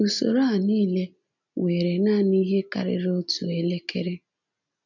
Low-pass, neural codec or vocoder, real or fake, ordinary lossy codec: 7.2 kHz; none; real; none